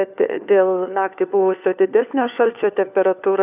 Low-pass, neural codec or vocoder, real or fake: 3.6 kHz; codec, 16 kHz, 4 kbps, FunCodec, trained on LibriTTS, 50 frames a second; fake